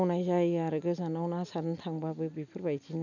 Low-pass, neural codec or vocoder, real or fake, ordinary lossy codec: 7.2 kHz; none; real; none